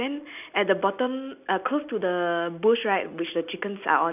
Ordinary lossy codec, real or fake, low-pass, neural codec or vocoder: none; real; 3.6 kHz; none